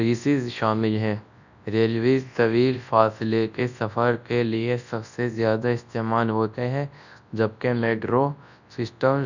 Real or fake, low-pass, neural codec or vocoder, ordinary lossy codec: fake; 7.2 kHz; codec, 24 kHz, 0.9 kbps, WavTokenizer, large speech release; none